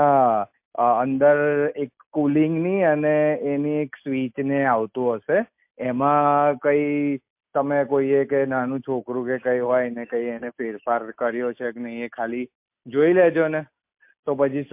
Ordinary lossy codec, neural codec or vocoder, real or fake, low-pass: MP3, 32 kbps; none; real; 3.6 kHz